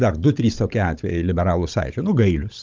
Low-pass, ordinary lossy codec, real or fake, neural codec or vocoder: 7.2 kHz; Opus, 24 kbps; fake; codec, 16 kHz, 16 kbps, FunCodec, trained on Chinese and English, 50 frames a second